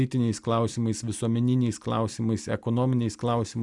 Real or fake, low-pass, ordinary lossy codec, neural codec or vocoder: real; 10.8 kHz; Opus, 64 kbps; none